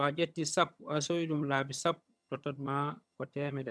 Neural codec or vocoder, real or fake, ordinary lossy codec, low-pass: vocoder, 22.05 kHz, 80 mel bands, HiFi-GAN; fake; none; none